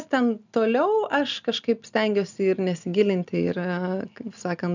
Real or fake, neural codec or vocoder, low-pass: real; none; 7.2 kHz